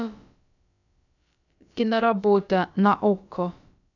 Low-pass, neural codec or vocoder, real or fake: 7.2 kHz; codec, 16 kHz, about 1 kbps, DyCAST, with the encoder's durations; fake